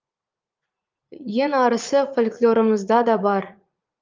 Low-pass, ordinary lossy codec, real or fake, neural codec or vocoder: 7.2 kHz; Opus, 32 kbps; fake; vocoder, 44.1 kHz, 80 mel bands, Vocos